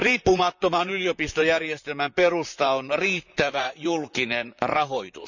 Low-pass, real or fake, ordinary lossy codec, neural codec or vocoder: 7.2 kHz; fake; none; vocoder, 44.1 kHz, 128 mel bands, Pupu-Vocoder